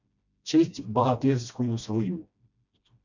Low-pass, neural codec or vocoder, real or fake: 7.2 kHz; codec, 16 kHz, 1 kbps, FreqCodec, smaller model; fake